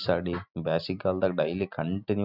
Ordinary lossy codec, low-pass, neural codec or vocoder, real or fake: none; 5.4 kHz; none; real